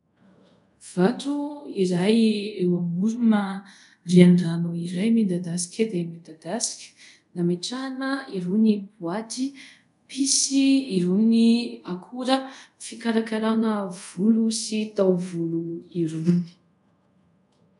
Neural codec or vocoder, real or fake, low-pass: codec, 24 kHz, 0.5 kbps, DualCodec; fake; 10.8 kHz